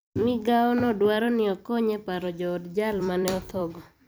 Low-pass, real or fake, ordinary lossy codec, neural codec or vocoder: none; fake; none; vocoder, 44.1 kHz, 128 mel bands every 256 samples, BigVGAN v2